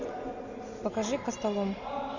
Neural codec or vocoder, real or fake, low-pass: none; real; 7.2 kHz